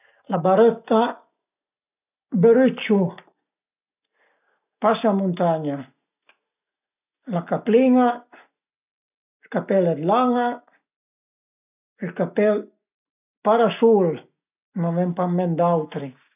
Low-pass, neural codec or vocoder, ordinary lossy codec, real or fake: 3.6 kHz; none; none; real